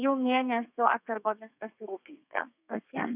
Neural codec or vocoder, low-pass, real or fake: codec, 32 kHz, 1.9 kbps, SNAC; 3.6 kHz; fake